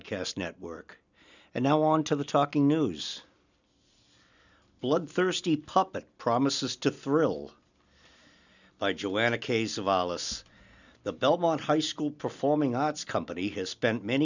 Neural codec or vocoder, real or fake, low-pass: none; real; 7.2 kHz